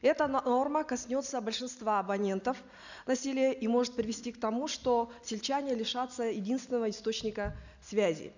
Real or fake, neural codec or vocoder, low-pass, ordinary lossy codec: real; none; 7.2 kHz; none